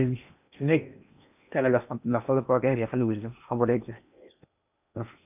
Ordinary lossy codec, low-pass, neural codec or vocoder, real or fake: none; 3.6 kHz; codec, 16 kHz in and 24 kHz out, 0.8 kbps, FocalCodec, streaming, 65536 codes; fake